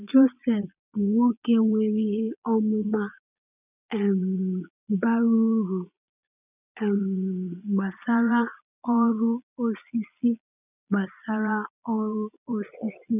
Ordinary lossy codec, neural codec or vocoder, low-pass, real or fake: none; vocoder, 22.05 kHz, 80 mel bands, Vocos; 3.6 kHz; fake